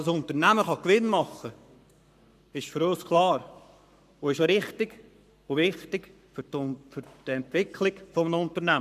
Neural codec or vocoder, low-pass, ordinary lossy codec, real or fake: codec, 44.1 kHz, 7.8 kbps, Pupu-Codec; 14.4 kHz; none; fake